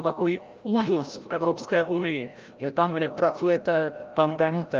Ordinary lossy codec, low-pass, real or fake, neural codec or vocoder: Opus, 32 kbps; 7.2 kHz; fake; codec, 16 kHz, 0.5 kbps, FreqCodec, larger model